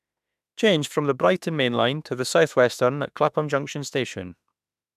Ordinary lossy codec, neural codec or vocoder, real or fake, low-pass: none; autoencoder, 48 kHz, 32 numbers a frame, DAC-VAE, trained on Japanese speech; fake; 14.4 kHz